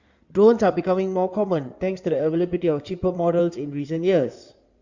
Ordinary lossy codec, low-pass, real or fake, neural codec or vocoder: Opus, 64 kbps; 7.2 kHz; fake; codec, 16 kHz in and 24 kHz out, 2.2 kbps, FireRedTTS-2 codec